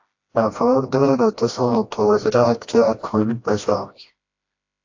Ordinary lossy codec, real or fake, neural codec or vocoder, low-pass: AAC, 48 kbps; fake; codec, 16 kHz, 1 kbps, FreqCodec, smaller model; 7.2 kHz